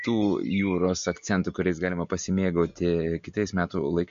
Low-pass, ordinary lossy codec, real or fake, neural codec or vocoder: 7.2 kHz; MP3, 48 kbps; real; none